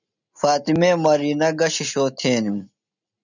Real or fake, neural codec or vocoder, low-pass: real; none; 7.2 kHz